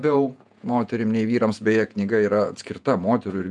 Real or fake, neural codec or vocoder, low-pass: fake; vocoder, 48 kHz, 128 mel bands, Vocos; 10.8 kHz